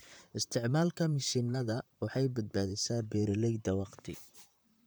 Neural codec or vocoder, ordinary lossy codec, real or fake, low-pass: vocoder, 44.1 kHz, 128 mel bands, Pupu-Vocoder; none; fake; none